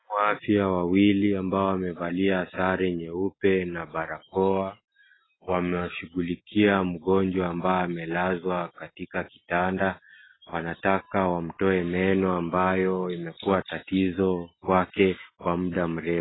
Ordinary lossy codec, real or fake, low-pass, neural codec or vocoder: AAC, 16 kbps; real; 7.2 kHz; none